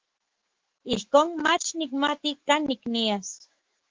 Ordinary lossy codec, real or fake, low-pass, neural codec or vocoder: Opus, 16 kbps; fake; 7.2 kHz; autoencoder, 48 kHz, 128 numbers a frame, DAC-VAE, trained on Japanese speech